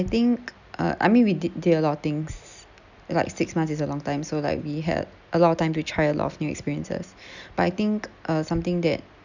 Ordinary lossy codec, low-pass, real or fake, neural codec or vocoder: none; 7.2 kHz; real; none